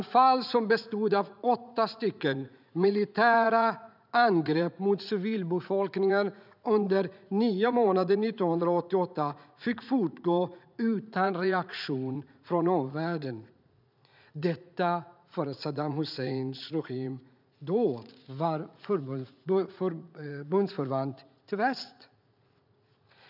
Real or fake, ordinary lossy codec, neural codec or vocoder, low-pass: fake; none; vocoder, 44.1 kHz, 80 mel bands, Vocos; 5.4 kHz